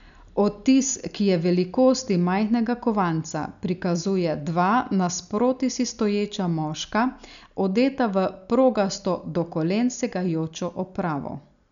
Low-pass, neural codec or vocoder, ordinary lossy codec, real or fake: 7.2 kHz; none; none; real